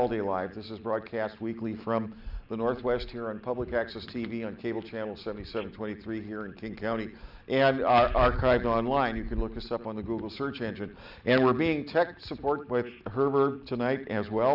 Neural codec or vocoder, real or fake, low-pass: none; real; 5.4 kHz